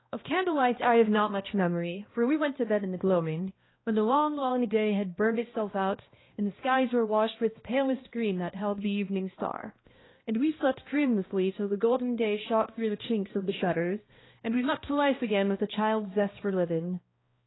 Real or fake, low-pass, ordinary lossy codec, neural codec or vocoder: fake; 7.2 kHz; AAC, 16 kbps; codec, 16 kHz, 1 kbps, X-Codec, HuBERT features, trained on balanced general audio